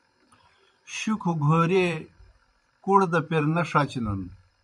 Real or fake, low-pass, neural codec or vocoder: fake; 10.8 kHz; vocoder, 44.1 kHz, 128 mel bands every 512 samples, BigVGAN v2